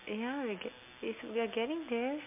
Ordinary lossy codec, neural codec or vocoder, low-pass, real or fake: MP3, 24 kbps; none; 3.6 kHz; real